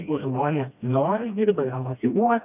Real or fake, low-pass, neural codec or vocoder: fake; 3.6 kHz; codec, 16 kHz, 1 kbps, FreqCodec, smaller model